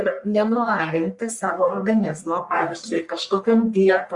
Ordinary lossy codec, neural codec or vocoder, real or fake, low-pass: Opus, 64 kbps; codec, 44.1 kHz, 1.7 kbps, Pupu-Codec; fake; 10.8 kHz